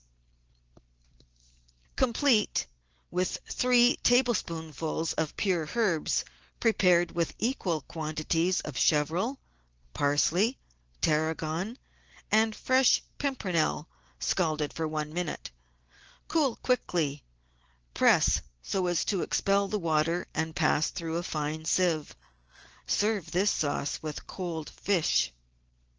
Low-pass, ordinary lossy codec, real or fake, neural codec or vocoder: 7.2 kHz; Opus, 16 kbps; real; none